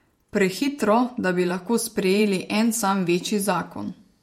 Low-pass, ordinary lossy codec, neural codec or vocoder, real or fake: 19.8 kHz; MP3, 64 kbps; vocoder, 48 kHz, 128 mel bands, Vocos; fake